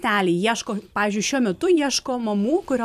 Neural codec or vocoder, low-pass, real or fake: vocoder, 44.1 kHz, 128 mel bands every 256 samples, BigVGAN v2; 14.4 kHz; fake